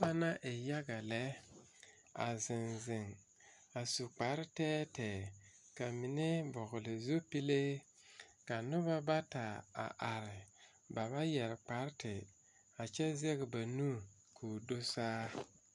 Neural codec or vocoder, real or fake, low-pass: none; real; 10.8 kHz